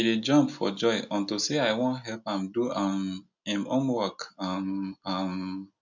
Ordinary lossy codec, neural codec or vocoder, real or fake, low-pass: none; none; real; 7.2 kHz